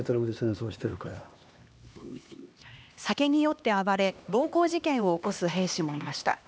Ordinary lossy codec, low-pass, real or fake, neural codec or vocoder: none; none; fake; codec, 16 kHz, 2 kbps, X-Codec, HuBERT features, trained on LibriSpeech